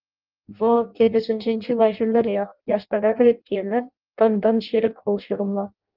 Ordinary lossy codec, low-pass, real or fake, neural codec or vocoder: Opus, 32 kbps; 5.4 kHz; fake; codec, 16 kHz in and 24 kHz out, 0.6 kbps, FireRedTTS-2 codec